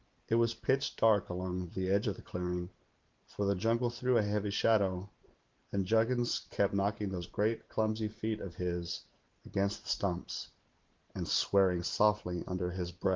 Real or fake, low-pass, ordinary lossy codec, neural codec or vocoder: fake; 7.2 kHz; Opus, 32 kbps; vocoder, 44.1 kHz, 128 mel bands every 512 samples, BigVGAN v2